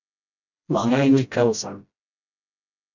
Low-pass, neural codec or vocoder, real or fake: 7.2 kHz; codec, 16 kHz, 0.5 kbps, FreqCodec, smaller model; fake